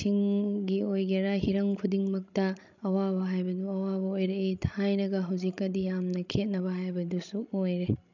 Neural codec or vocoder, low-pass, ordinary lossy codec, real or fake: codec, 16 kHz, 16 kbps, FreqCodec, larger model; 7.2 kHz; none; fake